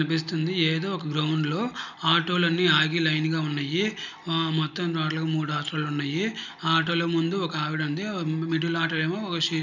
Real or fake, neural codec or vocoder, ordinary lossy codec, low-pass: real; none; none; 7.2 kHz